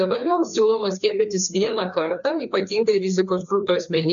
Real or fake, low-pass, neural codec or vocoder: fake; 7.2 kHz; codec, 16 kHz, 2 kbps, FreqCodec, larger model